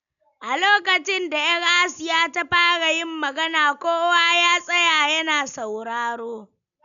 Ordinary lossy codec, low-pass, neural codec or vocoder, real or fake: none; 7.2 kHz; none; real